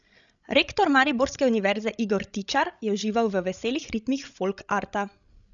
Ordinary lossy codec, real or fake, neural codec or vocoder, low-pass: none; fake; codec, 16 kHz, 16 kbps, FreqCodec, larger model; 7.2 kHz